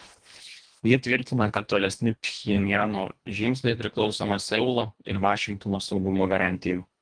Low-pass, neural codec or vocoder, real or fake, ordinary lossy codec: 9.9 kHz; codec, 24 kHz, 1.5 kbps, HILCodec; fake; Opus, 32 kbps